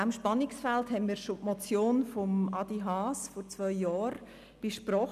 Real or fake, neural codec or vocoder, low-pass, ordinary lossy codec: real; none; 14.4 kHz; none